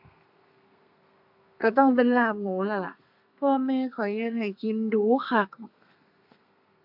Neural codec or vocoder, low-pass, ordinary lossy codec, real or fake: codec, 32 kHz, 1.9 kbps, SNAC; 5.4 kHz; AAC, 48 kbps; fake